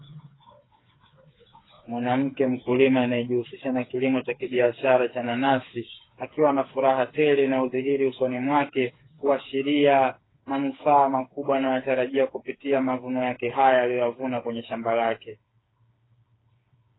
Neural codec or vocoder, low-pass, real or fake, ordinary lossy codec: codec, 16 kHz, 4 kbps, FreqCodec, smaller model; 7.2 kHz; fake; AAC, 16 kbps